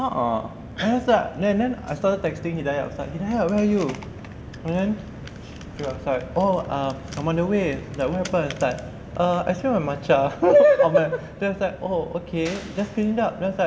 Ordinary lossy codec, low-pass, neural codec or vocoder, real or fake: none; none; none; real